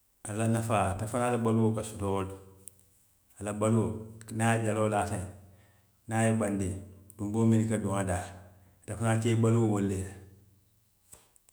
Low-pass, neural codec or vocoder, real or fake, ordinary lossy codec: none; autoencoder, 48 kHz, 128 numbers a frame, DAC-VAE, trained on Japanese speech; fake; none